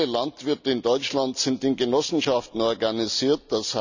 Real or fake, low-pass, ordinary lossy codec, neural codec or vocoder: real; 7.2 kHz; none; none